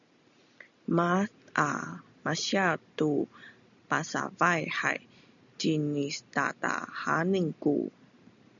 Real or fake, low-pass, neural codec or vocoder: real; 7.2 kHz; none